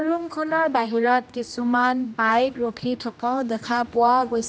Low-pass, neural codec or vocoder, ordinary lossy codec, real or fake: none; codec, 16 kHz, 1 kbps, X-Codec, HuBERT features, trained on general audio; none; fake